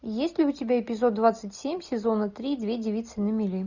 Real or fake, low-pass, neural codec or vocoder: real; 7.2 kHz; none